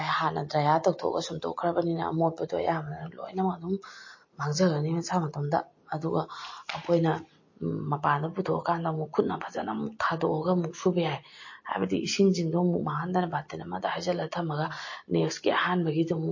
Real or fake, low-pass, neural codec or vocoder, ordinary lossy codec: real; 7.2 kHz; none; MP3, 32 kbps